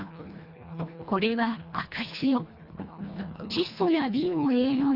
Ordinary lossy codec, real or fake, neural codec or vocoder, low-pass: none; fake; codec, 24 kHz, 1.5 kbps, HILCodec; 5.4 kHz